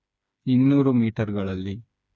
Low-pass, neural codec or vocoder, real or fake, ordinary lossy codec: none; codec, 16 kHz, 4 kbps, FreqCodec, smaller model; fake; none